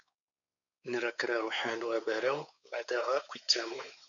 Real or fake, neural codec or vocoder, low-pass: fake; codec, 16 kHz, 4 kbps, X-Codec, WavLM features, trained on Multilingual LibriSpeech; 7.2 kHz